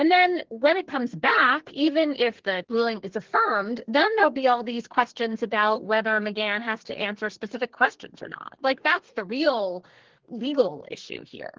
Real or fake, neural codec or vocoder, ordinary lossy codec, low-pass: fake; codec, 32 kHz, 1.9 kbps, SNAC; Opus, 16 kbps; 7.2 kHz